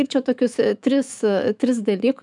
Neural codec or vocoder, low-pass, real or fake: autoencoder, 48 kHz, 128 numbers a frame, DAC-VAE, trained on Japanese speech; 10.8 kHz; fake